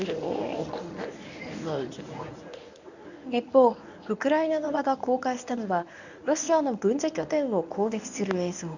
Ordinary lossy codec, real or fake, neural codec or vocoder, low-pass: none; fake; codec, 24 kHz, 0.9 kbps, WavTokenizer, medium speech release version 1; 7.2 kHz